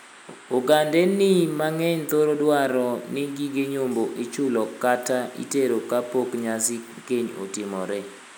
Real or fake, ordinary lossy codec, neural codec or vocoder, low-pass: real; none; none; none